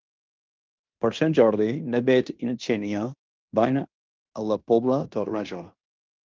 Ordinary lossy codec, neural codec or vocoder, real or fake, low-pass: Opus, 24 kbps; codec, 16 kHz in and 24 kHz out, 0.9 kbps, LongCat-Audio-Codec, fine tuned four codebook decoder; fake; 7.2 kHz